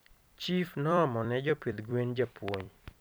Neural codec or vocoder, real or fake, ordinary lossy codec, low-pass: vocoder, 44.1 kHz, 128 mel bands every 256 samples, BigVGAN v2; fake; none; none